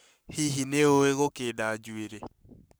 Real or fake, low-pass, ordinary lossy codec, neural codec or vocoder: fake; none; none; codec, 44.1 kHz, 7.8 kbps, Pupu-Codec